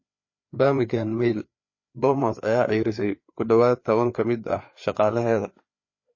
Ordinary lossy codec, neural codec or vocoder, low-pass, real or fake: MP3, 32 kbps; codec, 16 kHz, 4 kbps, FreqCodec, larger model; 7.2 kHz; fake